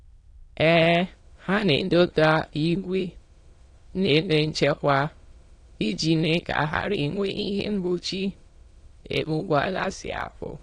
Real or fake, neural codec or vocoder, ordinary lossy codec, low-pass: fake; autoencoder, 22.05 kHz, a latent of 192 numbers a frame, VITS, trained on many speakers; AAC, 48 kbps; 9.9 kHz